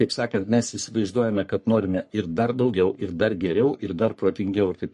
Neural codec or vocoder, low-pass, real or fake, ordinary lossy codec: codec, 44.1 kHz, 3.4 kbps, Pupu-Codec; 14.4 kHz; fake; MP3, 48 kbps